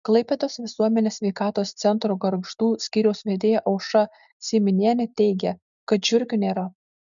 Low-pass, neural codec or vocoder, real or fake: 7.2 kHz; none; real